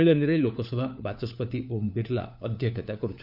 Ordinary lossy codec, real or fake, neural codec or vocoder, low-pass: none; fake; codec, 16 kHz, 4 kbps, FunCodec, trained on LibriTTS, 50 frames a second; 5.4 kHz